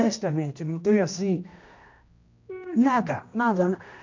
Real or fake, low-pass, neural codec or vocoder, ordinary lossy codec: fake; 7.2 kHz; codec, 16 kHz, 1 kbps, X-Codec, HuBERT features, trained on general audio; MP3, 48 kbps